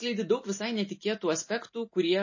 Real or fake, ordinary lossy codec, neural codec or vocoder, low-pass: real; MP3, 32 kbps; none; 7.2 kHz